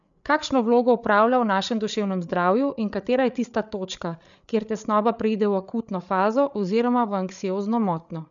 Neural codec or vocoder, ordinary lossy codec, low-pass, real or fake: codec, 16 kHz, 8 kbps, FreqCodec, larger model; none; 7.2 kHz; fake